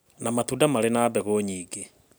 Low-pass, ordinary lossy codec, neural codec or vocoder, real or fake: none; none; none; real